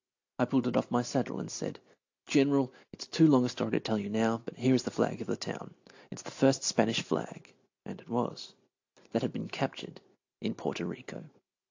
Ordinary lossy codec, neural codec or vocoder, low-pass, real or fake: AAC, 48 kbps; none; 7.2 kHz; real